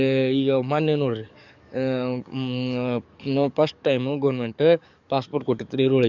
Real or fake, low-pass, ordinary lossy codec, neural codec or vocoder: fake; 7.2 kHz; none; codec, 44.1 kHz, 7.8 kbps, DAC